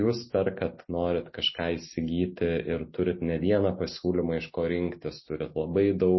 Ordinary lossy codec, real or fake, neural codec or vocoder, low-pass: MP3, 24 kbps; real; none; 7.2 kHz